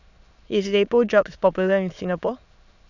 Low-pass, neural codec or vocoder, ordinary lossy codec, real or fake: 7.2 kHz; autoencoder, 22.05 kHz, a latent of 192 numbers a frame, VITS, trained on many speakers; none; fake